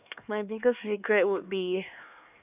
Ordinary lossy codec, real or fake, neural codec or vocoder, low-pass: none; fake; codec, 16 kHz, 2 kbps, X-Codec, HuBERT features, trained on LibriSpeech; 3.6 kHz